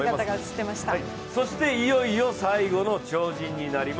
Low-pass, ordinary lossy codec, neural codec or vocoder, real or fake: none; none; none; real